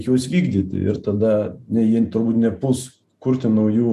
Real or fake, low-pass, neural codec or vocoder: real; 14.4 kHz; none